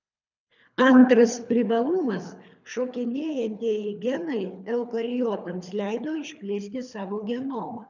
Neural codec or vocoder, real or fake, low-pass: codec, 24 kHz, 3 kbps, HILCodec; fake; 7.2 kHz